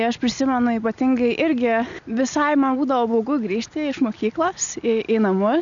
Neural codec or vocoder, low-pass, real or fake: none; 7.2 kHz; real